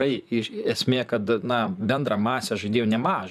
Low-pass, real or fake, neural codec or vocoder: 14.4 kHz; fake; vocoder, 44.1 kHz, 128 mel bands, Pupu-Vocoder